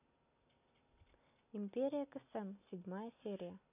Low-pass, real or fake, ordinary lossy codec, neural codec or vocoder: 3.6 kHz; real; none; none